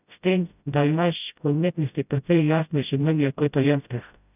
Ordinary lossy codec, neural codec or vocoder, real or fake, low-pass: none; codec, 16 kHz, 0.5 kbps, FreqCodec, smaller model; fake; 3.6 kHz